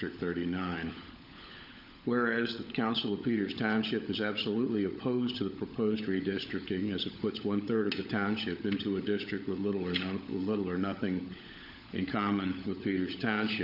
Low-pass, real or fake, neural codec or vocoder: 5.4 kHz; fake; codec, 16 kHz, 16 kbps, FunCodec, trained on LibriTTS, 50 frames a second